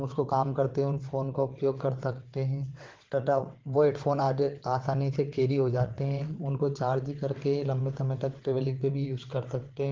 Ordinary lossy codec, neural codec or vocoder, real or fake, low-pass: Opus, 16 kbps; vocoder, 22.05 kHz, 80 mel bands, WaveNeXt; fake; 7.2 kHz